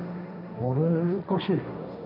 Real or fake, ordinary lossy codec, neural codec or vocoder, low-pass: fake; none; codec, 16 kHz, 1.1 kbps, Voila-Tokenizer; 5.4 kHz